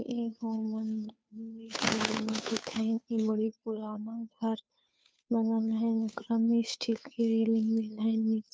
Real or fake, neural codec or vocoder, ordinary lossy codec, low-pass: fake; codec, 16 kHz, 2 kbps, FunCodec, trained on Chinese and English, 25 frames a second; none; none